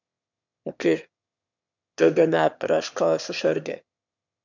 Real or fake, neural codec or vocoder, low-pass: fake; autoencoder, 22.05 kHz, a latent of 192 numbers a frame, VITS, trained on one speaker; 7.2 kHz